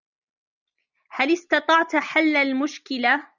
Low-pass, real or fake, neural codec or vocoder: 7.2 kHz; real; none